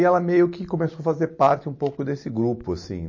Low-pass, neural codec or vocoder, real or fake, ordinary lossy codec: 7.2 kHz; none; real; MP3, 32 kbps